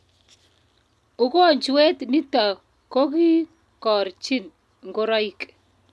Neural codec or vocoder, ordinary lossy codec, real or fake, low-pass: none; none; real; none